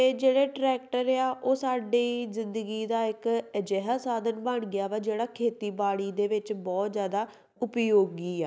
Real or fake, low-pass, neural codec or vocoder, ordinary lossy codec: real; none; none; none